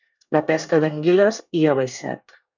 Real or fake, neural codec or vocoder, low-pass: fake; codec, 24 kHz, 1 kbps, SNAC; 7.2 kHz